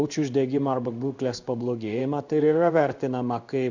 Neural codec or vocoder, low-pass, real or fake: codec, 16 kHz in and 24 kHz out, 1 kbps, XY-Tokenizer; 7.2 kHz; fake